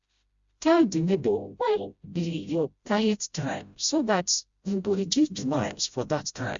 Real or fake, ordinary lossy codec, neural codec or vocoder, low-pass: fake; Opus, 64 kbps; codec, 16 kHz, 0.5 kbps, FreqCodec, smaller model; 7.2 kHz